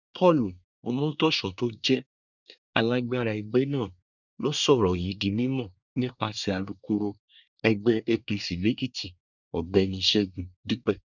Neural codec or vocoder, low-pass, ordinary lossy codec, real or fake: codec, 24 kHz, 1 kbps, SNAC; 7.2 kHz; none; fake